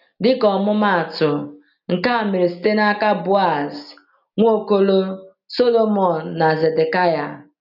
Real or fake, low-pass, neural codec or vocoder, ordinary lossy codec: real; 5.4 kHz; none; none